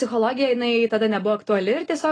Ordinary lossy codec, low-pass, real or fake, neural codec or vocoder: AAC, 32 kbps; 9.9 kHz; real; none